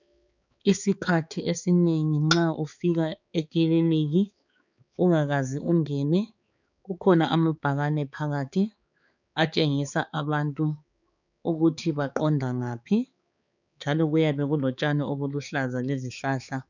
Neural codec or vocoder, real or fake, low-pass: codec, 16 kHz, 4 kbps, X-Codec, HuBERT features, trained on balanced general audio; fake; 7.2 kHz